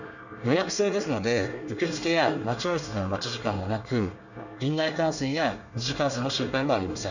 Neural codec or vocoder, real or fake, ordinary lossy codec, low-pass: codec, 24 kHz, 1 kbps, SNAC; fake; none; 7.2 kHz